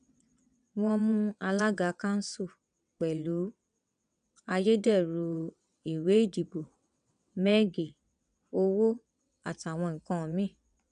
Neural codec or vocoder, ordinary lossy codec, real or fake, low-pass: vocoder, 22.05 kHz, 80 mel bands, WaveNeXt; none; fake; 9.9 kHz